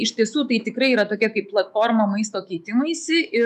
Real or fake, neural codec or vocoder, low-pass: fake; autoencoder, 48 kHz, 128 numbers a frame, DAC-VAE, trained on Japanese speech; 14.4 kHz